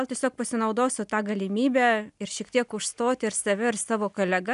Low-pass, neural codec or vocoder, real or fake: 10.8 kHz; none; real